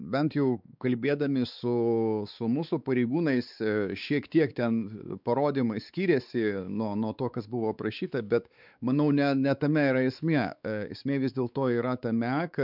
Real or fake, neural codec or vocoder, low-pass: fake; codec, 16 kHz, 4 kbps, X-Codec, WavLM features, trained on Multilingual LibriSpeech; 5.4 kHz